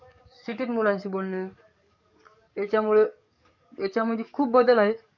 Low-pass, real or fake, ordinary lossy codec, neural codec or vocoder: 7.2 kHz; fake; none; codec, 44.1 kHz, 7.8 kbps, DAC